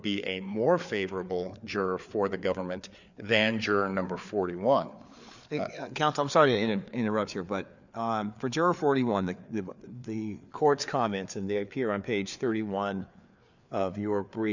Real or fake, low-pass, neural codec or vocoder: fake; 7.2 kHz; codec, 16 kHz, 4 kbps, FreqCodec, larger model